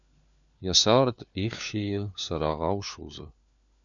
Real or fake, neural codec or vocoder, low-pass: fake; codec, 16 kHz, 4 kbps, FunCodec, trained on LibriTTS, 50 frames a second; 7.2 kHz